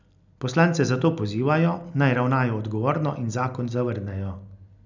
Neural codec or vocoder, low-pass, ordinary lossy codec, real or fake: none; 7.2 kHz; none; real